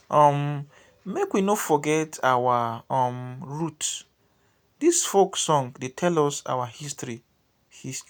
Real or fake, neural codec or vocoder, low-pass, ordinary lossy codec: real; none; none; none